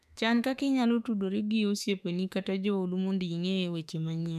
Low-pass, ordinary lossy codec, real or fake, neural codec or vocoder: 14.4 kHz; none; fake; autoencoder, 48 kHz, 32 numbers a frame, DAC-VAE, trained on Japanese speech